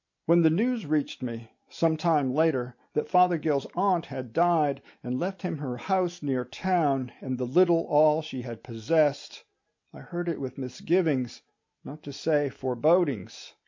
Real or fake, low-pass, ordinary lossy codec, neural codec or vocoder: real; 7.2 kHz; MP3, 48 kbps; none